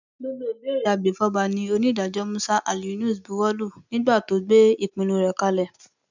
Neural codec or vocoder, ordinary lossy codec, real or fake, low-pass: none; none; real; 7.2 kHz